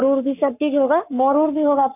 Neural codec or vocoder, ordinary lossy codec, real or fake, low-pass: codec, 44.1 kHz, 7.8 kbps, Pupu-Codec; none; fake; 3.6 kHz